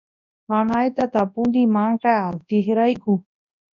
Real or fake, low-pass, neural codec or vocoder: fake; 7.2 kHz; codec, 24 kHz, 0.9 kbps, WavTokenizer, large speech release